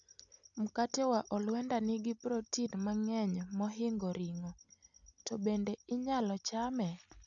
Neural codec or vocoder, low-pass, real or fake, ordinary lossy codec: none; 7.2 kHz; real; none